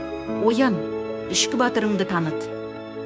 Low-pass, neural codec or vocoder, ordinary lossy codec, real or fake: none; codec, 16 kHz, 6 kbps, DAC; none; fake